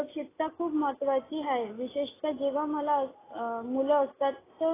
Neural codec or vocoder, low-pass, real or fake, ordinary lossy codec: none; 3.6 kHz; real; AAC, 16 kbps